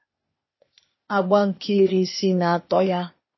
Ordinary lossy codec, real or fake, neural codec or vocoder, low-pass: MP3, 24 kbps; fake; codec, 16 kHz, 0.8 kbps, ZipCodec; 7.2 kHz